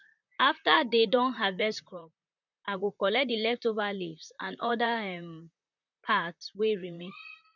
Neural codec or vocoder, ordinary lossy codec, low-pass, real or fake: vocoder, 44.1 kHz, 128 mel bands, Pupu-Vocoder; none; 7.2 kHz; fake